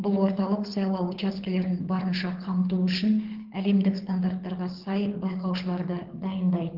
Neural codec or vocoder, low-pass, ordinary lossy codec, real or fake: codec, 24 kHz, 6 kbps, HILCodec; 5.4 kHz; Opus, 16 kbps; fake